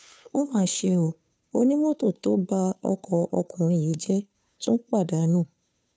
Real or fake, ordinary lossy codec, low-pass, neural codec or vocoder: fake; none; none; codec, 16 kHz, 2 kbps, FunCodec, trained on Chinese and English, 25 frames a second